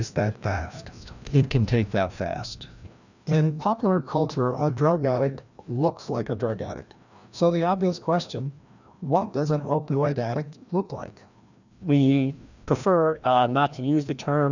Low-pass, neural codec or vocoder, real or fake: 7.2 kHz; codec, 16 kHz, 1 kbps, FreqCodec, larger model; fake